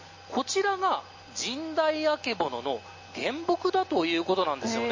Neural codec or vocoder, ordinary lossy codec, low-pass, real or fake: none; MP3, 32 kbps; 7.2 kHz; real